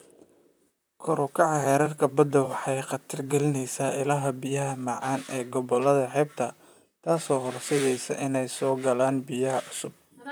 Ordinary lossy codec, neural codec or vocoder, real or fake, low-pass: none; vocoder, 44.1 kHz, 128 mel bands, Pupu-Vocoder; fake; none